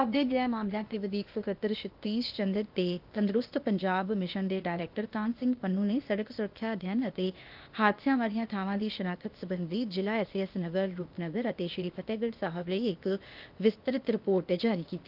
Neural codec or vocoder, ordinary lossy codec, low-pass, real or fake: codec, 16 kHz, 0.8 kbps, ZipCodec; Opus, 32 kbps; 5.4 kHz; fake